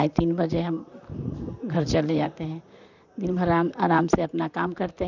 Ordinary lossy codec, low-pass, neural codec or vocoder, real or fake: none; 7.2 kHz; vocoder, 44.1 kHz, 128 mel bands, Pupu-Vocoder; fake